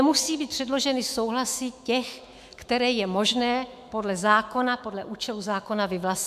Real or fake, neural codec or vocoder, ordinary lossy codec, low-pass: fake; autoencoder, 48 kHz, 128 numbers a frame, DAC-VAE, trained on Japanese speech; MP3, 96 kbps; 14.4 kHz